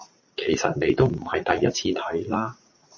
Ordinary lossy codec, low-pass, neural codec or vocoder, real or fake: MP3, 32 kbps; 7.2 kHz; vocoder, 44.1 kHz, 80 mel bands, Vocos; fake